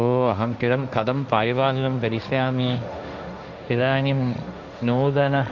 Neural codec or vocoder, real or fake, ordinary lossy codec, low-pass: codec, 16 kHz, 1.1 kbps, Voila-Tokenizer; fake; none; 7.2 kHz